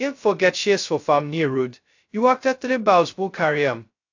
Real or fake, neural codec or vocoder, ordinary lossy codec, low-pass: fake; codec, 16 kHz, 0.2 kbps, FocalCodec; AAC, 48 kbps; 7.2 kHz